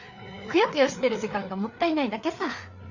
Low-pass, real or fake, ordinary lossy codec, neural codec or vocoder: 7.2 kHz; fake; none; codec, 16 kHz, 4 kbps, FreqCodec, larger model